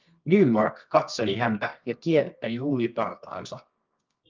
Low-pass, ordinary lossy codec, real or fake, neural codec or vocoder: 7.2 kHz; Opus, 32 kbps; fake; codec, 24 kHz, 0.9 kbps, WavTokenizer, medium music audio release